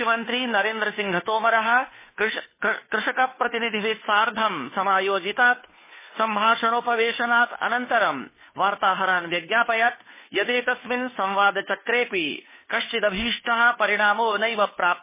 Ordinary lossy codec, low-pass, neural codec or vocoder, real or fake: MP3, 16 kbps; 3.6 kHz; codec, 16 kHz, 2 kbps, FunCodec, trained on Chinese and English, 25 frames a second; fake